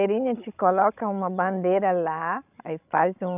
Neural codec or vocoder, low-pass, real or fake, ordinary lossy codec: codec, 16 kHz, 16 kbps, FreqCodec, larger model; 3.6 kHz; fake; none